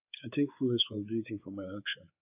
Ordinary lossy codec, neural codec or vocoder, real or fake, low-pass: AAC, 32 kbps; codec, 16 kHz, 4 kbps, X-Codec, WavLM features, trained on Multilingual LibriSpeech; fake; 3.6 kHz